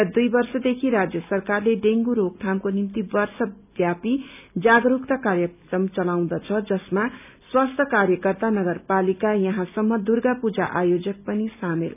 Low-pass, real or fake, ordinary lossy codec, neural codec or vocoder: 3.6 kHz; real; none; none